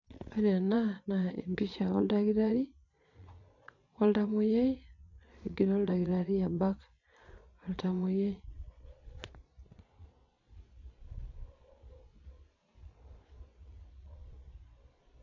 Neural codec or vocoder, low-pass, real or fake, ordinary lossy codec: vocoder, 22.05 kHz, 80 mel bands, Vocos; 7.2 kHz; fake; none